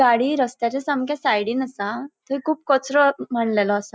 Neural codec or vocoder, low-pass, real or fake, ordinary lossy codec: none; none; real; none